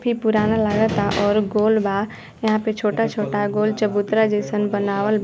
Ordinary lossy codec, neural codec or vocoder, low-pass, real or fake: none; none; none; real